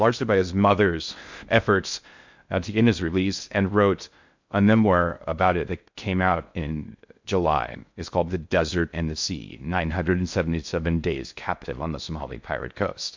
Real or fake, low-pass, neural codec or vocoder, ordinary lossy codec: fake; 7.2 kHz; codec, 16 kHz in and 24 kHz out, 0.6 kbps, FocalCodec, streaming, 2048 codes; MP3, 64 kbps